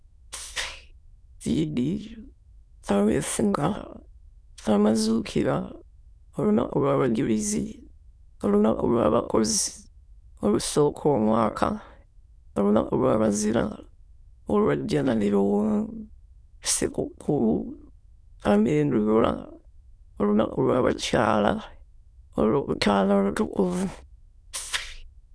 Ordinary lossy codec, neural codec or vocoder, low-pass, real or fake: none; autoencoder, 22.05 kHz, a latent of 192 numbers a frame, VITS, trained on many speakers; none; fake